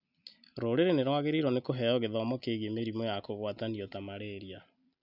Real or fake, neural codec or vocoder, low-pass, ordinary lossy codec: real; none; 5.4 kHz; none